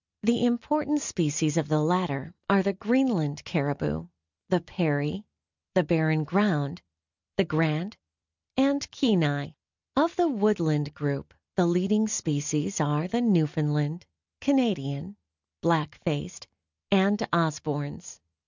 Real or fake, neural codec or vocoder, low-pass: real; none; 7.2 kHz